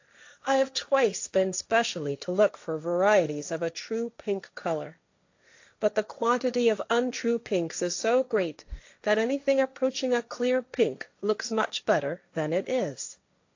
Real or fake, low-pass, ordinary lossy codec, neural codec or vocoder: fake; 7.2 kHz; AAC, 48 kbps; codec, 16 kHz, 1.1 kbps, Voila-Tokenizer